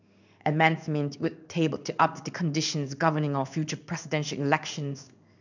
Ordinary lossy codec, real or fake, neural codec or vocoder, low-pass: none; fake; codec, 16 kHz in and 24 kHz out, 1 kbps, XY-Tokenizer; 7.2 kHz